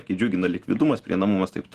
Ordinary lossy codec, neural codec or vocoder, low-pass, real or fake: Opus, 16 kbps; none; 14.4 kHz; real